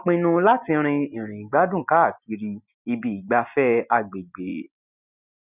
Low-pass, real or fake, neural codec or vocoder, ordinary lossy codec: 3.6 kHz; real; none; none